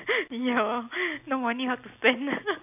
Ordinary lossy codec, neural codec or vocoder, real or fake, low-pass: none; none; real; 3.6 kHz